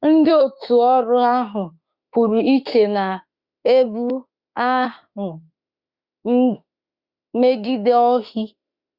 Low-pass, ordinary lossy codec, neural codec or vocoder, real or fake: 5.4 kHz; Opus, 64 kbps; autoencoder, 48 kHz, 32 numbers a frame, DAC-VAE, trained on Japanese speech; fake